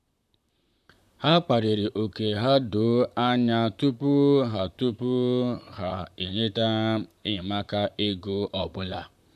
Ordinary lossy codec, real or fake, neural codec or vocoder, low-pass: none; fake; vocoder, 44.1 kHz, 128 mel bands, Pupu-Vocoder; 14.4 kHz